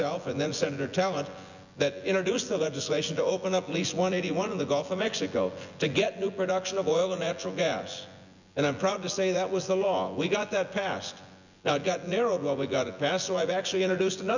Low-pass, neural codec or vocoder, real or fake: 7.2 kHz; vocoder, 24 kHz, 100 mel bands, Vocos; fake